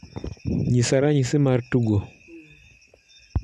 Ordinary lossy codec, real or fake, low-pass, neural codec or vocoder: none; real; none; none